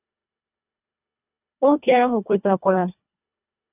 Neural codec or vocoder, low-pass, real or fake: codec, 24 kHz, 1.5 kbps, HILCodec; 3.6 kHz; fake